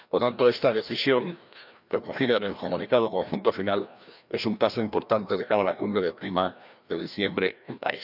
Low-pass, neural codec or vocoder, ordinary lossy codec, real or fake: 5.4 kHz; codec, 16 kHz, 1 kbps, FreqCodec, larger model; none; fake